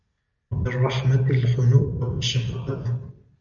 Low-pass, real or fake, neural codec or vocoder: 7.2 kHz; real; none